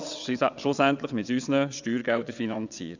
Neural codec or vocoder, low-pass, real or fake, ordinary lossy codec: vocoder, 22.05 kHz, 80 mel bands, WaveNeXt; 7.2 kHz; fake; none